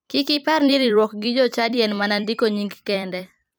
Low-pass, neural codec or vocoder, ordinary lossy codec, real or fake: none; vocoder, 44.1 kHz, 128 mel bands every 256 samples, BigVGAN v2; none; fake